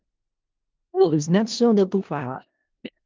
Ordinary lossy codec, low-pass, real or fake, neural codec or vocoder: Opus, 32 kbps; 7.2 kHz; fake; codec, 16 kHz in and 24 kHz out, 0.4 kbps, LongCat-Audio-Codec, four codebook decoder